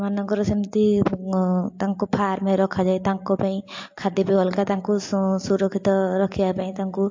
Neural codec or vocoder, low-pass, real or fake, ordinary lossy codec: none; 7.2 kHz; real; MP3, 48 kbps